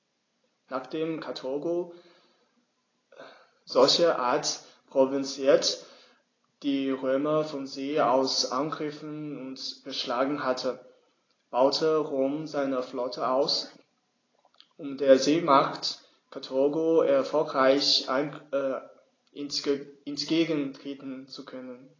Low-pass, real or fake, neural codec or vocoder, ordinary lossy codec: 7.2 kHz; real; none; AAC, 32 kbps